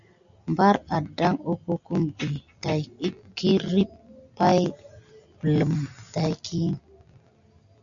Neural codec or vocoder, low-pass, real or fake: none; 7.2 kHz; real